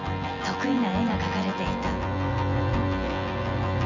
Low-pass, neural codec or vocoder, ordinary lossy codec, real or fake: 7.2 kHz; vocoder, 24 kHz, 100 mel bands, Vocos; none; fake